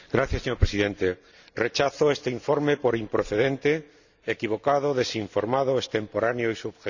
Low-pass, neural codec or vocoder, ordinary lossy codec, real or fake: 7.2 kHz; none; none; real